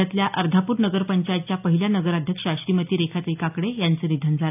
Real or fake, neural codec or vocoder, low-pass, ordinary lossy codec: real; none; 3.6 kHz; AAC, 32 kbps